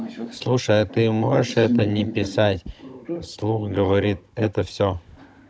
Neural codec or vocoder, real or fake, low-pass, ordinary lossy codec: codec, 16 kHz, 16 kbps, FunCodec, trained on Chinese and English, 50 frames a second; fake; none; none